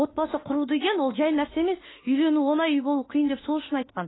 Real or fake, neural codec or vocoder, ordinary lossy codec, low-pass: fake; autoencoder, 48 kHz, 32 numbers a frame, DAC-VAE, trained on Japanese speech; AAC, 16 kbps; 7.2 kHz